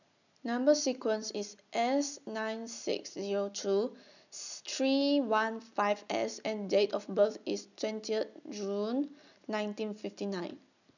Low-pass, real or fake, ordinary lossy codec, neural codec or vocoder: 7.2 kHz; real; none; none